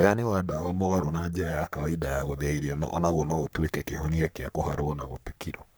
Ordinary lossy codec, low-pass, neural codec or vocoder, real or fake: none; none; codec, 44.1 kHz, 3.4 kbps, Pupu-Codec; fake